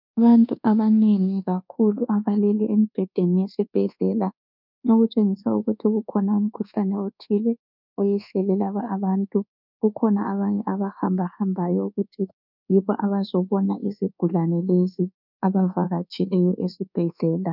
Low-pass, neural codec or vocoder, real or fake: 5.4 kHz; codec, 24 kHz, 1.2 kbps, DualCodec; fake